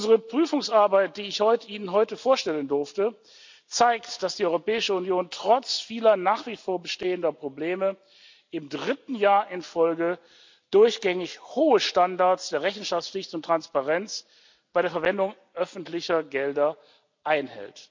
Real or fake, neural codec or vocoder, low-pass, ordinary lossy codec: real; none; 7.2 kHz; MP3, 64 kbps